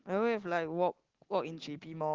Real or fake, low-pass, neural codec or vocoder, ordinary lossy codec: real; 7.2 kHz; none; Opus, 16 kbps